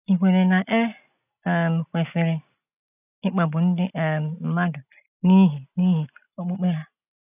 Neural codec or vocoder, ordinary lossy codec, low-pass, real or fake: none; none; 3.6 kHz; real